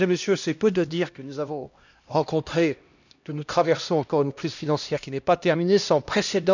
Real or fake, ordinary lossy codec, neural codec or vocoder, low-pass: fake; none; codec, 16 kHz, 1 kbps, X-Codec, HuBERT features, trained on LibriSpeech; 7.2 kHz